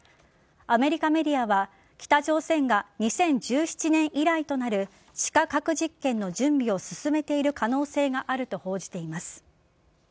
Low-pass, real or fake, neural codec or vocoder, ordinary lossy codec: none; real; none; none